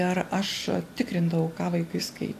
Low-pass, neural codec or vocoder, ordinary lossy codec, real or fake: 14.4 kHz; none; AAC, 64 kbps; real